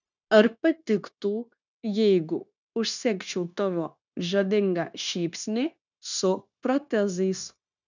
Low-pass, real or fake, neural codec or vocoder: 7.2 kHz; fake; codec, 16 kHz, 0.9 kbps, LongCat-Audio-Codec